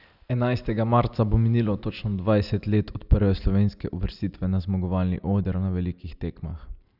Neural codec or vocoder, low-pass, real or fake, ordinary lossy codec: none; 5.4 kHz; real; none